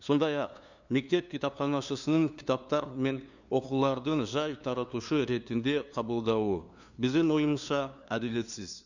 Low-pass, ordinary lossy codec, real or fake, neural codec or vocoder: 7.2 kHz; none; fake; codec, 16 kHz, 2 kbps, FunCodec, trained on LibriTTS, 25 frames a second